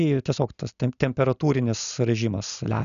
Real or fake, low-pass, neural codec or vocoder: real; 7.2 kHz; none